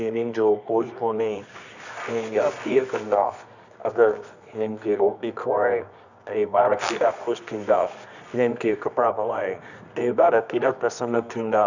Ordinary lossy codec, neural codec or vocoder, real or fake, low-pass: none; codec, 24 kHz, 0.9 kbps, WavTokenizer, medium music audio release; fake; 7.2 kHz